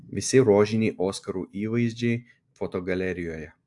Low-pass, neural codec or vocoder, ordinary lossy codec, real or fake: 10.8 kHz; none; AAC, 64 kbps; real